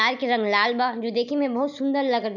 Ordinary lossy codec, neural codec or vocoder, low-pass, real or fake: none; none; 7.2 kHz; real